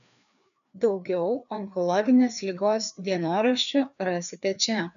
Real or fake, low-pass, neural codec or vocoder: fake; 7.2 kHz; codec, 16 kHz, 2 kbps, FreqCodec, larger model